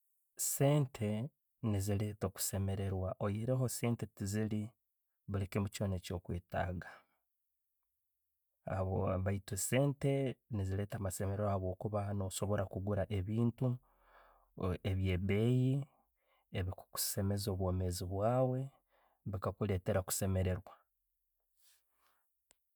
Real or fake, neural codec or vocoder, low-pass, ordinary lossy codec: real; none; none; none